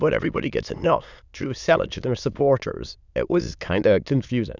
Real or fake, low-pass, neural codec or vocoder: fake; 7.2 kHz; autoencoder, 22.05 kHz, a latent of 192 numbers a frame, VITS, trained on many speakers